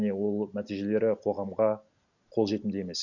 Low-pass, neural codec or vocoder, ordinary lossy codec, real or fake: 7.2 kHz; none; none; real